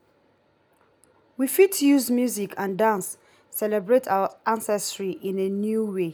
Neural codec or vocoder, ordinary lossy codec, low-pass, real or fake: none; none; none; real